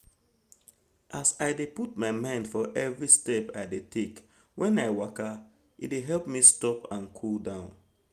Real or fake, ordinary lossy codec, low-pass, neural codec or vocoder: real; Opus, 32 kbps; 14.4 kHz; none